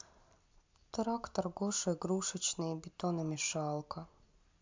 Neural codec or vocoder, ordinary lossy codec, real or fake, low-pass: none; MP3, 64 kbps; real; 7.2 kHz